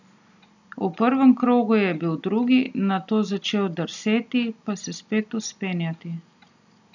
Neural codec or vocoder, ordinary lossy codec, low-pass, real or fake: none; none; 7.2 kHz; real